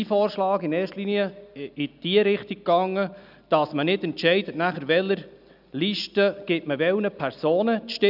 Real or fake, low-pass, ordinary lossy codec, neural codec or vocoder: real; 5.4 kHz; none; none